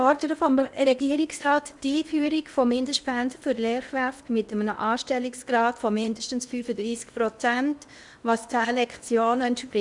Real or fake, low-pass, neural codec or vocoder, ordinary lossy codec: fake; 10.8 kHz; codec, 16 kHz in and 24 kHz out, 0.8 kbps, FocalCodec, streaming, 65536 codes; none